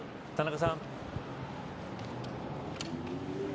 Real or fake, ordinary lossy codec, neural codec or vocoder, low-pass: real; none; none; none